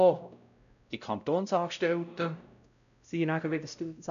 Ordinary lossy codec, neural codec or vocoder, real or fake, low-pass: none; codec, 16 kHz, 0.5 kbps, X-Codec, WavLM features, trained on Multilingual LibriSpeech; fake; 7.2 kHz